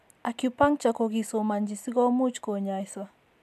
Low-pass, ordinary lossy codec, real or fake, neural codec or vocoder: 14.4 kHz; AAC, 96 kbps; real; none